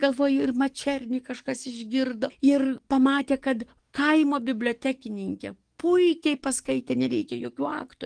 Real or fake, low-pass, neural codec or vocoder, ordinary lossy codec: fake; 9.9 kHz; codec, 44.1 kHz, 7.8 kbps, DAC; Opus, 24 kbps